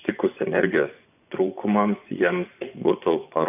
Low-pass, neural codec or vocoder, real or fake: 3.6 kHz; vocoder, 24 kHz, 100 mel bands, Vocos; fake